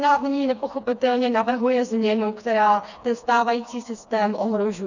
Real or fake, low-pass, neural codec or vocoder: fake; 7.2 kHz; codec, 16 kHz, 2 kbps, FreqCodec, smaller model